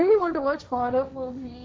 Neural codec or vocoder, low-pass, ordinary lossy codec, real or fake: codec, 16 kHz, 1.1 kbps, Voila-Tokenizer; none; none; fake